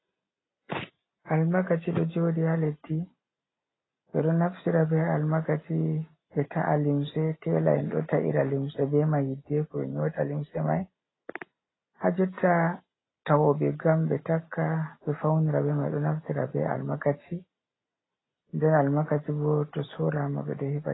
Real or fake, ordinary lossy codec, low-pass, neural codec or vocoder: real; AAC, 16 kbps; 7.2 kHz; none